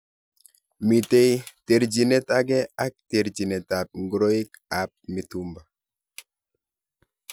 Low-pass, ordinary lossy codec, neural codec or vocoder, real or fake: none; none; none; real